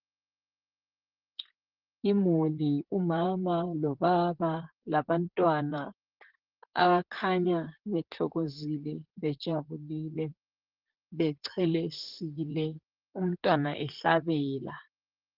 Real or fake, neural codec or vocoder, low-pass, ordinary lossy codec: fake; vocoder, 44.1 kHz, 128 mel bands, Pupu-Vocoder; 5.4 kHz; Opus, 16 kbps